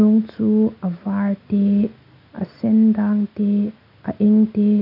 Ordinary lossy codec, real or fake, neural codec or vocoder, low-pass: none; real; none; 5.4 kHz